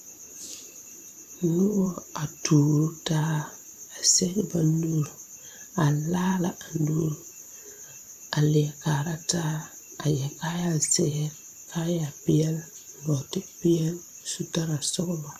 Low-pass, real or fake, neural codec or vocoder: 14.4 kHz; fake; vocoder, 44.1 kHz, 128 mel bands every 512 samples, BigVGAN v2